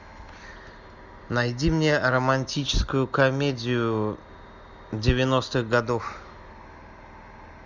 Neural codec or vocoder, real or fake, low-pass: none; real; 7.2 kHz